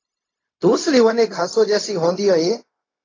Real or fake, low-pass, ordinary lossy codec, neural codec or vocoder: fake; 7.2 kHz; AAC, 32 kbps; codec, 16 kHz, 0.4 kbps, LongCat-Audio-Codec